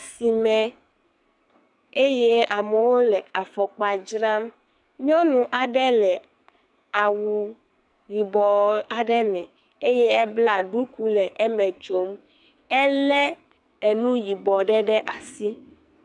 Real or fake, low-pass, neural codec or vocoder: fake; 10.8 kHz; codec, 44.1 kHz, 2.6 kbps, SNAC